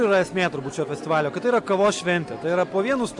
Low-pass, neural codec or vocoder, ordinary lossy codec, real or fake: 10.8 kHz; none; MP3, 96 kbps; real